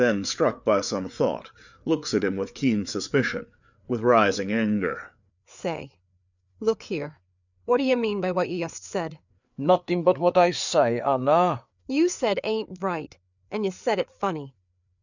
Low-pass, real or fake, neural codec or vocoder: 7.2 kHz; fake; codec, 16 kHz, 4 kbps, FreqCodec, larger model